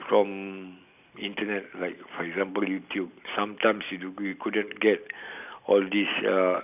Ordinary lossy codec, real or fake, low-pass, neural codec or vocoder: none; real; 3.6 kHz; none